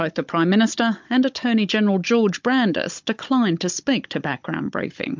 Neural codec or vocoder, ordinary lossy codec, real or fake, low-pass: codec, 16 kHz, 16 kbps, FunCodec, trained on Chinese and English, 50 frames a second; MP3, 64 kbps; fake; 7.2 kHz